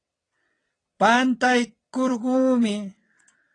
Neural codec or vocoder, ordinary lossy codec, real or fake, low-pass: vocoder, 22.05 kHz, 80 mel bands, WaveNeXt; AAC, 32 kbps; fake; 9.9 kHz